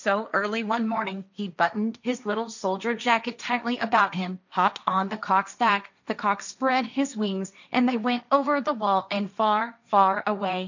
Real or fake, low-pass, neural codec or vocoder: fake; 7.2 kHz; codec, 16 kHz, 1.1 kbps, Voila-Tokenizer